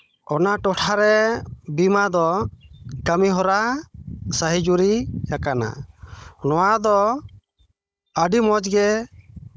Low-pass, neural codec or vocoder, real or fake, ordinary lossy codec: none; codec, 16 kHz, 16 kbps, FunCodec, trained on Chinese and English, 50 frames a second; fake; none